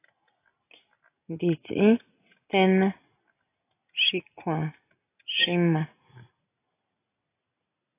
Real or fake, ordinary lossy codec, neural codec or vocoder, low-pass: real; AAC, 24 kbps; none; 3.6 kHz